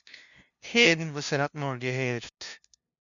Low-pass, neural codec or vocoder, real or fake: 7.2 kHz; codec, 16 kHz, 0.5 kbps, FunCodec, trained on LibriTTS, 25 frames a second; fake